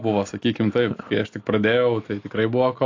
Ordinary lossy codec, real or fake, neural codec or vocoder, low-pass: AAC, 48 kbps; real; none; 7.2 kHz